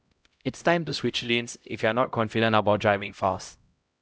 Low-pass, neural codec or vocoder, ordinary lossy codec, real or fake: none; codec, 16 kHz, 0.5 kbps, X-Codec, HuBERT features, trained on LibriSpeech; none; fake